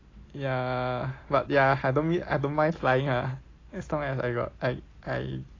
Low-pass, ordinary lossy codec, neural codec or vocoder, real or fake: 7.2 kHz; AAC, 32 kbps; none; real